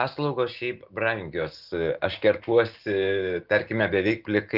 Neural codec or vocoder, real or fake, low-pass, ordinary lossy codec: none; real; 5.4 kHz; Opus, 32 kbps